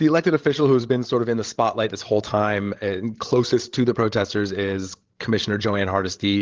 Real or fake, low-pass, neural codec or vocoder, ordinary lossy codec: fake; 7.2 kHz; codec, 16 kHz, 16 kbps, FreqCodec, larger model; Opus, 16 kbps